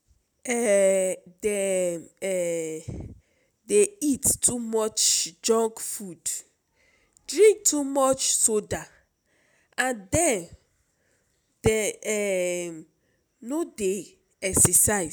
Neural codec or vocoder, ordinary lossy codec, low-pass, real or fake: none; none; none; real